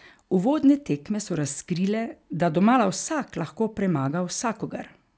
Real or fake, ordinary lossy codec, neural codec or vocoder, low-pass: real; none; none; none